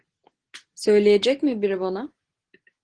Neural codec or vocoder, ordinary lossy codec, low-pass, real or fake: none; Opus, 16 kbps; 9.9 kHz; real